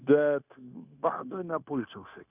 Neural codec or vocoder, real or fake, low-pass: codec, 16 kHz, 0.9 kbps, LongCat-Audio-Codec; fake; 3.6 kHz